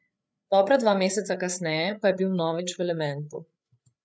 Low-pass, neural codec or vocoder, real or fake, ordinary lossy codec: none; codec, 16 kHz, 8 kbps, FreqCodec, larger model; fake; none